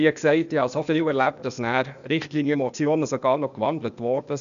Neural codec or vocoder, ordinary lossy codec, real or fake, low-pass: codec, 16 kHz, 0.8 kbps, ZipCodec; none; fake; 7.2 kHz